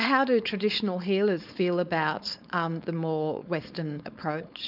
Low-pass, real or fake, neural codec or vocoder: 5.4 kHz; fake; codec, 16 kHz, 4.8 kbps, FACodec